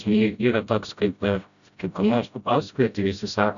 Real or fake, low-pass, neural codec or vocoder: fake; 7.2 kHz; codec, 16 kHz, 0.5 kbps, FreqCodec, smaller model